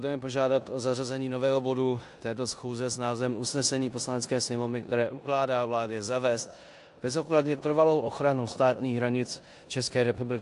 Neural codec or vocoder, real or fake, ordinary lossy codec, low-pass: codec, 16 kHz in and 24 kHz out, 0.9 kbps, LongCat-Audio-Codec, four codebook decoder; fake; AAC, 64 kbps; 10.8 kHz